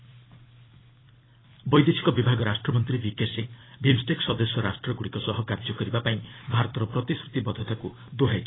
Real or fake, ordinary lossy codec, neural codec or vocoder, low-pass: fake; AAC, 16 kbps; vocoder, 44.1 kHz, 128 mel bands every 256 samples, BigVGAN v2; 7.2 kHz